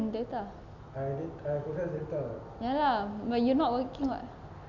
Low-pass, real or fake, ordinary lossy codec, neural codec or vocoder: 7.2 kHz; real; none; none